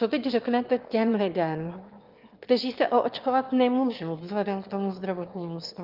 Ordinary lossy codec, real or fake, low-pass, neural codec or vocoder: Opus, 32 kbps; fake; 5.4 kHz; autoencoder, 22.05 kHz, a latent of 192 numbers a frame, VITS, trained on one speaker